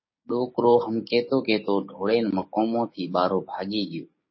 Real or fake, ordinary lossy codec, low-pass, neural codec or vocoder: fake; MP3, 24 kbps; 7.2 kHz; codec, 16 kHz, 6 kbps, DAC